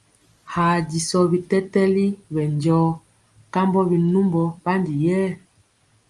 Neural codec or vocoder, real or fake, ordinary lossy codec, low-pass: none; real; Opus, 32 kbps; 10.8 kHz